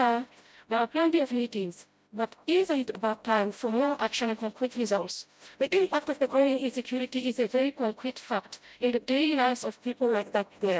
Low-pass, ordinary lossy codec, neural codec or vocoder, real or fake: none; none; codec, 16 kHz, 0.5 kbps, FreqCodec, smaller model; fake